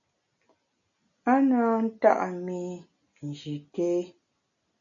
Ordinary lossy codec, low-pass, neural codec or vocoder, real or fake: MP3, 96 kbps; 7.2 kHz; none; real